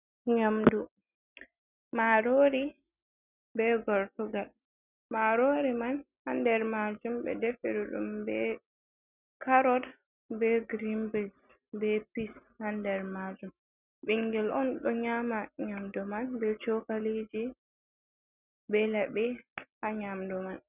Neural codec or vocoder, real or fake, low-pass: none; real; 3.6 kHz